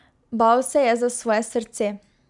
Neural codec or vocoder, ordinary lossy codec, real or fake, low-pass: none; none; real; 10.8 kHz